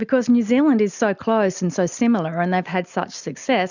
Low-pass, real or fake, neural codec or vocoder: 7.2 kHz; real; none